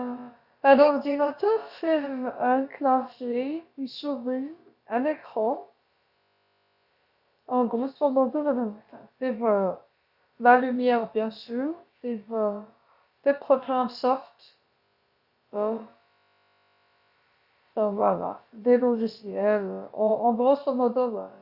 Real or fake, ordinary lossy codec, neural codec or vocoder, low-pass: fake; Opus, 64 kbps; codec, 16 kHz, about 1 kbps, DyCAST, with the encoder's durations; 5.4 kHz